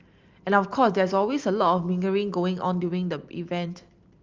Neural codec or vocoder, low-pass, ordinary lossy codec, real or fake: none; 7.2 kHz; Opus, 32 kbps; real